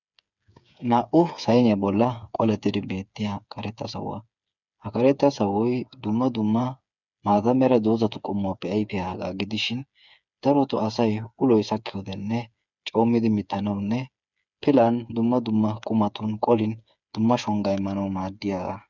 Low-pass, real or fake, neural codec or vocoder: 7.2 kHz; fake; codec, 16 kHz, 8 kbps, FreqCodec, smaller model